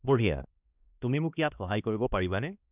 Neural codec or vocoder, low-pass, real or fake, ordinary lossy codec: codec, 16 kHz, 1 kbps, X-Codec, HuBERT features, trained on balanced general audio; 3.6 kHz; fake; none